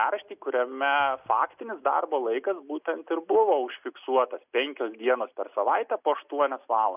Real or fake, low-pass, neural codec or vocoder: real; 3.6 kHz; none